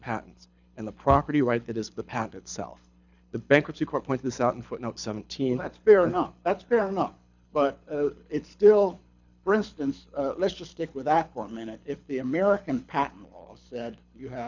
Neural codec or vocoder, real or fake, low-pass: codec, 24 kHz, 6 kbps, HILCodec; fake; 7.2 kHz